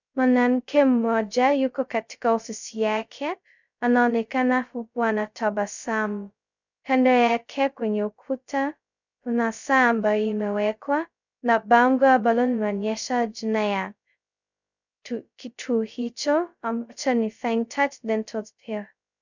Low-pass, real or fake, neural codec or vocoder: 7.2 kHz; fake; codec, 16 kHz, 0.2 kbps, FocalCodec